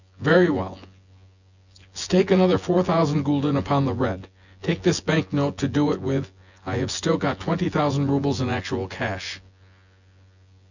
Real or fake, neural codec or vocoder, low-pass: fake; vocoder, 24 kHz, 100 mel bands, Vocos; 7.2 kHz